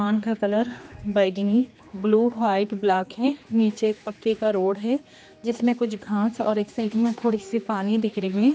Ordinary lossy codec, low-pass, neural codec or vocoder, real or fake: none; none; codec, 16 kHz, 2 kbps, X-Codec, HuBERT features, trained on general audio; fake